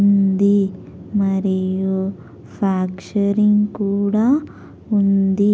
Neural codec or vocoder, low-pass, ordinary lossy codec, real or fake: none; none; none; real